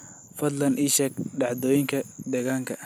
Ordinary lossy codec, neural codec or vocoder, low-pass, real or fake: none; none; none; real